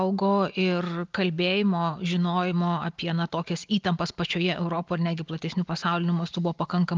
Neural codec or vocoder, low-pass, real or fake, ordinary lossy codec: none; 7.2 kHz; real; Opus, 24 kbps